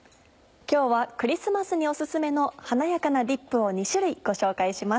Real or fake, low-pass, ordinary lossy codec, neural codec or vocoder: real; none; none; none